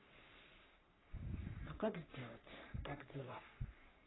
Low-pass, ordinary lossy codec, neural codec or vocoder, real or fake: 7.2 kHz; AAC, 16 kbps; codec, 44.1 kHz, 3.4 kbps, Pupu-Codec; fake